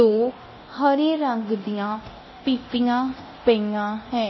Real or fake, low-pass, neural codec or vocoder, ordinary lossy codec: fake; 7.2 kHz; codec, 24 kHz, 0.9 kbps, DualCodec; MP3, 24 kbps